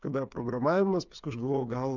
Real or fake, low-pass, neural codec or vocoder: fake; 7.2 kHz; codec, 16 kHz, 8 kbps, FreqCodec, smaller model